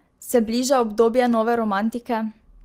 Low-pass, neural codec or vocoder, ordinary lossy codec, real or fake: 14.4 kHz; none; Opus, 24 kbps; real